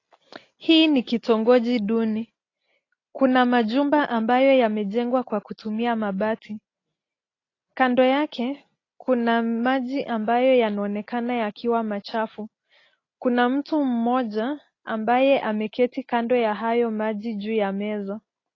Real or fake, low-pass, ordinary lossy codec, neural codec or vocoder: real; 7.2 kHz; AAC, 32 kbps; none